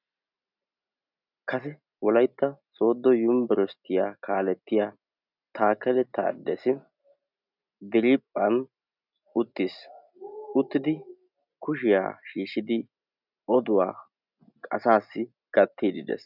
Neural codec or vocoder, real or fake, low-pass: none; real; 5.4 kHz